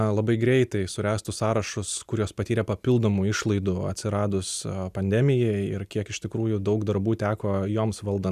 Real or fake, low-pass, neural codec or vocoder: real; 14.4 kHz; none